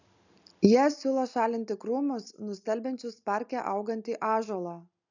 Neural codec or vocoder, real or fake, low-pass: none; real; 7.2 kHz